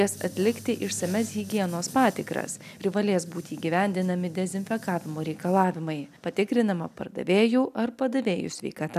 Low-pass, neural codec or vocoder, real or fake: 14.4 kHz; none; real